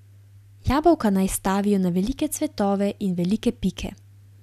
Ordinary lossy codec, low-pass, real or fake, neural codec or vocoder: none; 14.4 kHz; real; none